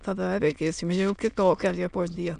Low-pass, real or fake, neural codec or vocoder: 9.9 kHz; fake; autoencoder, 22.05 kHz, a latent of 192 numbers a frame, VITS, trained on many speakers